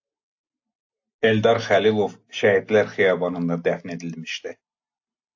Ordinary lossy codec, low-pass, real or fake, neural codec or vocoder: AAC, 48 kbps; 7.2 kHz; real; none